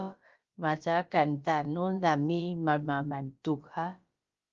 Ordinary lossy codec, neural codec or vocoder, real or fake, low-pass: Opus, 32 kbps; codec, 16 kHz, about 1 kbps, DyCAST, with the encoder's durations; fake; 7.2 kHz